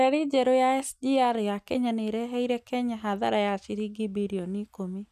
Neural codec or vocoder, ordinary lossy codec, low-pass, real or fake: none; none; 14.4 kHz; real